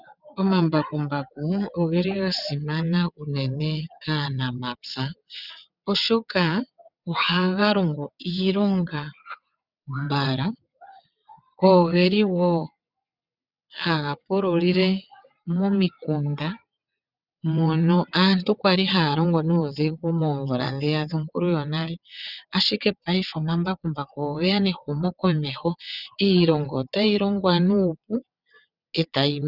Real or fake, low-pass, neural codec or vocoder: fake; 5.4 kHz; vocoder, 22.05 kHz, 80 mel bands, WaveNeXt